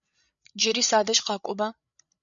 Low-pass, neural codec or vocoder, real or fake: 7.2 kHz; codec, 16 kHz, 8 kbps, FreqCodec, larger model; fake